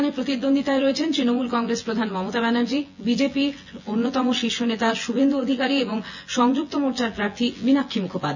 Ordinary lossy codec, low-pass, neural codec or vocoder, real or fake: none; 7.2 kHz; vocoder, 24 kHz, 100 mel bands, Vocos; fake